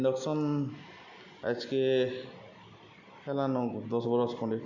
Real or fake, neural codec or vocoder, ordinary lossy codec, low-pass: real; none; none; 7.2 kHz